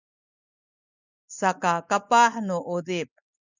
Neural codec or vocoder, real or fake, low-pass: none; real; 7.2 kHz